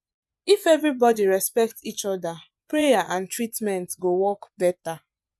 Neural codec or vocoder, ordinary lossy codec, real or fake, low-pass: vocoder, 24 kHz, 100 mel bands, Vocos; none; fake; none